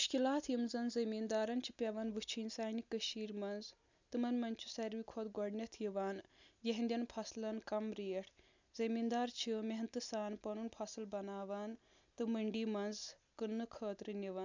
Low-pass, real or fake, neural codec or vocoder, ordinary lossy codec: 7.2 kHz; real; none; none